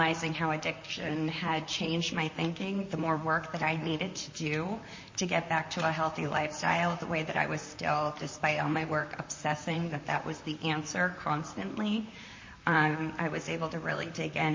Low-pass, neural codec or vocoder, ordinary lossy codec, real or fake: 7.2 kHz; vocoder, 44.1 kHz, 128 mel bands, Pupu-Vocoder; MP3, 32 kbps; fake